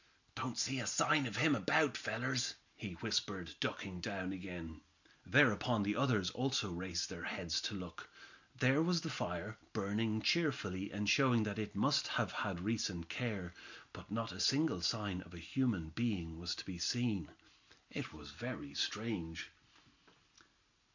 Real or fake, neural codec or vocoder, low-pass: real; none; 7.2 kHz